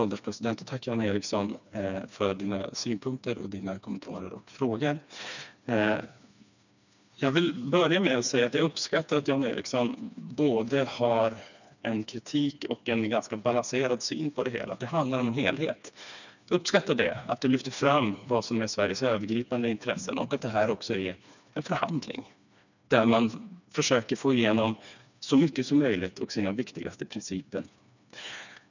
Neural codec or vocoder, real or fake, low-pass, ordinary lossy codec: codec, 16 kHz, 2 kbps, FreqCodec, smaller model; fake; 7.2 kHz; none